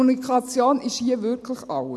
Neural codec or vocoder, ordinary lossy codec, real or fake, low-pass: none; none; real; none